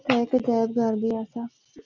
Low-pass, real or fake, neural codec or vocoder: 7.2 kHz; real; none